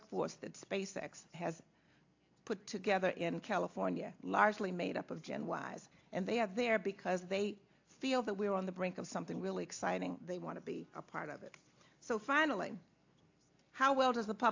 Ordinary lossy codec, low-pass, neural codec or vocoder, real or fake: AAC, 48 kbps; 7.2 kHz; none; real